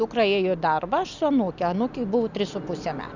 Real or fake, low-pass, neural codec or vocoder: real; 7.2 kHz; none